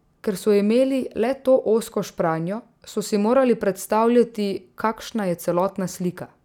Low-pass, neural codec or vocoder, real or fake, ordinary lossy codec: 19.8 kHz; none; real; none